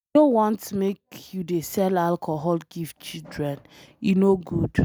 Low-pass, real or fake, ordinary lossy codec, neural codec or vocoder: none; real; none; none